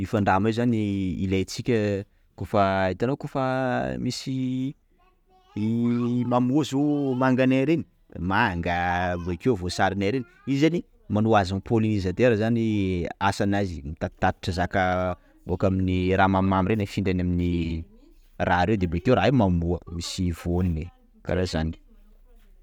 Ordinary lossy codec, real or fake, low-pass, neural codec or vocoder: none; real; 19.8 kHz; none